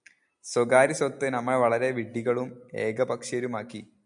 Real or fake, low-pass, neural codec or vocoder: real; 9.9 kHz; none